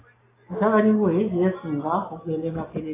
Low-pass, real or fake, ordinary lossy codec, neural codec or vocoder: 3.6 kHz; real; AAC, 16 kbps; none